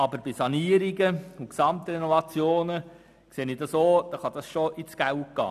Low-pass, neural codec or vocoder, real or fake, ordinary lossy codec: 14.4 kHz; none; real; none